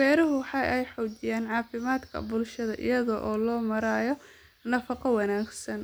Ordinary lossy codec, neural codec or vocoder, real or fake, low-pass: none; none; real; none